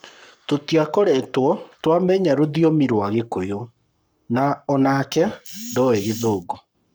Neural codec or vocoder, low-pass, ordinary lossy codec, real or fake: codec, 44.1 kHz, 7.8 kbps, Pupu-Codec; none; none; fake